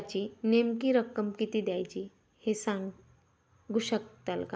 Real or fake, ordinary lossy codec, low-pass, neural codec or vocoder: real; none; none; none